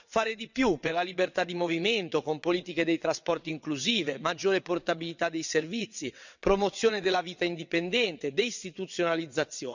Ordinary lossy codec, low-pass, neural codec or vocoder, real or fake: none; 7.2 kHz; vocoder, 22.05 kHz, 80 mel bands, WaveNeXt; fake